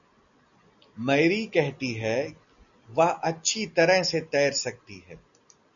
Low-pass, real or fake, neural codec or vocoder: 7.2 kHz; real; none